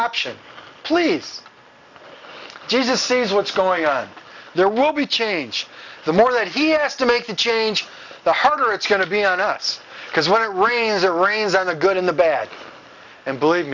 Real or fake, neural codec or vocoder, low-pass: real; none; 7.2 kHz